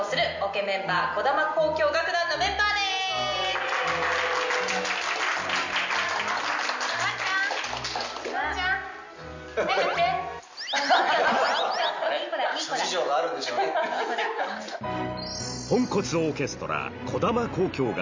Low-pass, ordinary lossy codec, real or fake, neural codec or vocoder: 7.2 kHz; MP3, 64 kbps; real; none